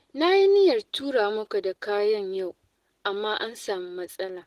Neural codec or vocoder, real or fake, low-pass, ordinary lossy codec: none; real; 14.4 kHz; Opus, 16 kbps